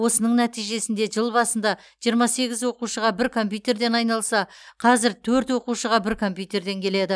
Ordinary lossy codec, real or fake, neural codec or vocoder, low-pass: none; real; none; none